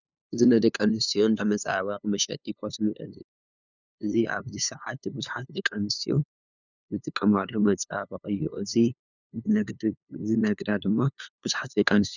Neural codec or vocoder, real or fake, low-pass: codec, 16 kHz, 2 kbps, FunCodec, trained on LibriTTS, 25 frames a second; fake; 7.2 kHz